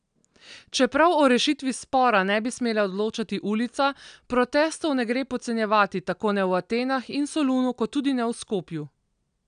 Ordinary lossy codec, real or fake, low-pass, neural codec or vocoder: none; real; 9.9 kHz; none